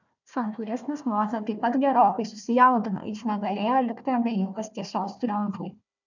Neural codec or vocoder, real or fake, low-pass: codec, 16 kHz, 1 kbps, FunCodec, trained on Chinese and English, 50 frames a second; fake; 7.2 kHz